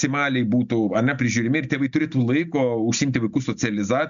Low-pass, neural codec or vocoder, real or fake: 7.2 kHz; none; real